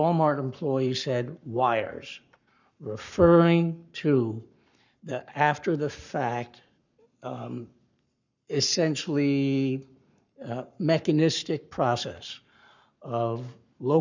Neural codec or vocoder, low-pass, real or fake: codec, 44.1 kHz, 7.8 kbps, Pupu-Codec; 7.2 kHz; fake